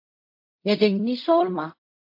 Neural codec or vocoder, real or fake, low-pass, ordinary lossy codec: codec, 16 kHz in and 24 kHz out, 0.4 kbps, LongCat-Audio-Codec, fine tuned four codebook decoder; fake; 5.4 kHz; MP3, 32 kbps